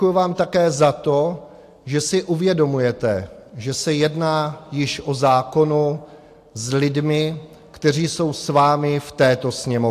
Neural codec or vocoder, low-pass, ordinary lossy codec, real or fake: none; 14.4 kHz; AAC, 64 kbps; real